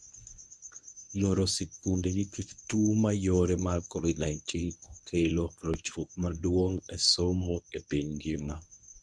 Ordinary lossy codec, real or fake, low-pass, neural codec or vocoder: none; fake; none; codec, 24 kHz, 0.9 kbps, WavTokenizer, medium speech release version 1